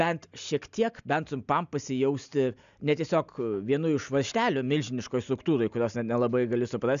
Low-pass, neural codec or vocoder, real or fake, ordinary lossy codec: 7.2 kHz; none; real; MP3, 96 kbps